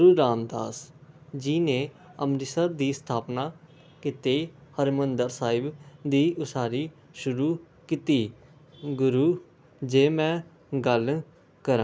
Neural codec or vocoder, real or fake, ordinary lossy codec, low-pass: none; real; none; none